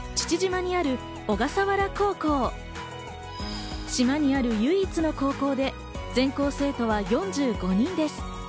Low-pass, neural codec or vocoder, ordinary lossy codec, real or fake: none; none; none; real